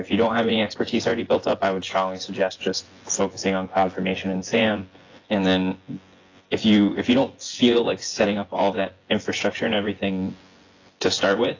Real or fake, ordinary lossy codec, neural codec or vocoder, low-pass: fake; AAC, 32 kbps; vocoder, 24 kHz, 100 mel bands, Vocos; 7.2 kHz